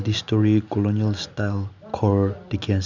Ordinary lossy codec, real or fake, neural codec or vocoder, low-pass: Opus, 64 kbps; real; none; 7.2 kHz